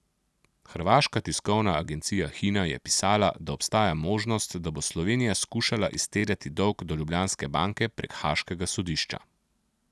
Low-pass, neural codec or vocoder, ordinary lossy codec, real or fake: none; none; none; real